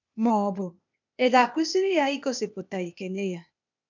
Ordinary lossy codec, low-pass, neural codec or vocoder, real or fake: none; 7.2 kHz; codec, 16 kHz, 0.8 kbps, ZipCodec; fake